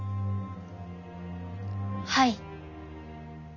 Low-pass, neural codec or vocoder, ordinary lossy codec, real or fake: 7.2 kHz; none; none; real